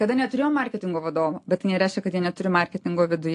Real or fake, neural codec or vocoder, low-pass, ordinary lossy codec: real; none; 10.8 kHz; AAC, 48 kbps